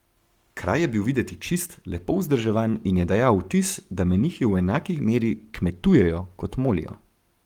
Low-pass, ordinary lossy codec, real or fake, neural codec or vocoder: 19.8 kHz; Opus, 32 kbps; fake; codec, 44.1 kHz, 7.8 kbps, Pupu-Codec